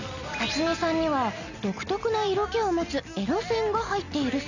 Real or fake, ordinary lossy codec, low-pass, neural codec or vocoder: real; none; 7.2 kHz; none